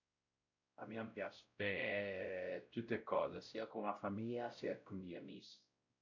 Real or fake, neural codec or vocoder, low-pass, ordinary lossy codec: fake; codec, 16 kHz, 0.5 kbps, X-Codec, WavLM features, trained on Multilingual LibriSpeech; 7.2 kHz; none